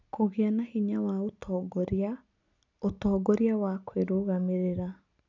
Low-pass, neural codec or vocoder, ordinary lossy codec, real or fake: 7.2 kHz; none; none; real